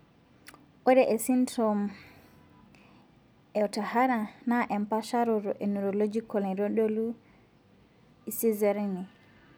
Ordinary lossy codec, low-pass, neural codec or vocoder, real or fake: none; none; none; real